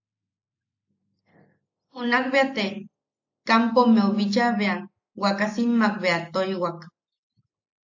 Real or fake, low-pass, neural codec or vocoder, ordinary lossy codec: real; 7.2 kHz; none; AAC, 48 kbps